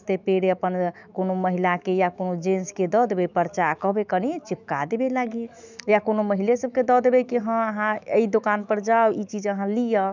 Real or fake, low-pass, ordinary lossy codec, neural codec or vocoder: fake; 7.2 kHz; none; autoencoder, 48 kHz, 128 numbers a frame, DAC-VAE, trained on Japanese speech